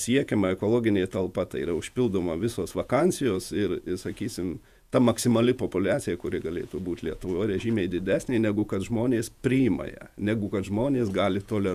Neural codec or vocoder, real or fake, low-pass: vocoder, 48 kHz, 128 mel bands, Vocos; fake; 14.4 kHz